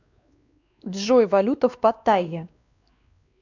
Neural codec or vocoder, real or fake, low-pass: codec, 16 kHz, 2 kbps, X-Codec, WavLM features, trained on Multilingual LibriSpeech; fake; 7.2 kHz